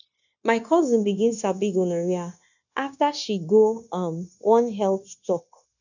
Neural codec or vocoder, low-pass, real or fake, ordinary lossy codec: codec, 16 kHz, 0.9 kbps, LongCat-Audio-Codec; 7.2 kHz; fake; none